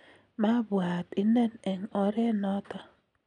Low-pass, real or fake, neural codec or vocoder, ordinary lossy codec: 9.9 kHz; real; none; none